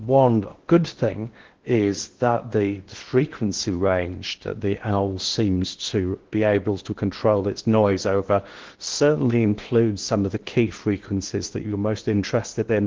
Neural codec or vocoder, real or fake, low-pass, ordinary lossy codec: codec, 16 kHz in and 24 kHz out, 0.6 kbps, FocalCodec, streaming, 4096 codes; fake; 7.2 kHz; Opus, 16 kbps